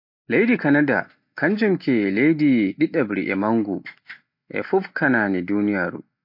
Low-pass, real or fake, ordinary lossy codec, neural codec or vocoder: 5.4 kHz; real; MP3, 32 kbps; none